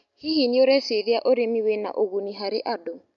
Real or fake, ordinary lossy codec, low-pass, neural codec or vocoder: real; none; 7.2 kHz; none